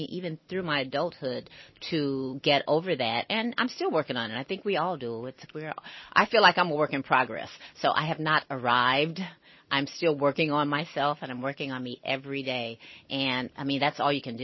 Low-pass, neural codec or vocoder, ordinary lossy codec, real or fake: 7.2 kHz; none; MP3, 24 kbps; real